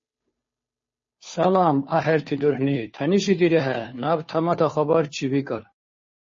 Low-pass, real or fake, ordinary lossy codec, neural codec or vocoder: 7.2 kHz; fake; MP3, 32 kbps; codec, 16 kHz, 2 kbps, FunCodec, trained on Chinese and English, 25 frames a second